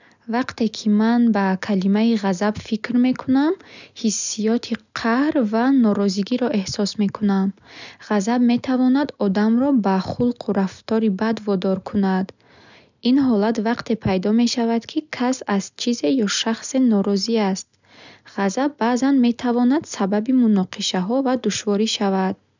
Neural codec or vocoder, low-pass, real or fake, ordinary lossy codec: none; 7.2 kHz; real; none